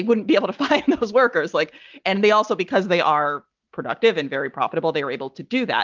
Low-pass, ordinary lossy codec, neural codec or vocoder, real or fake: 7.2 kHz; Opus, 24 kbps; none; real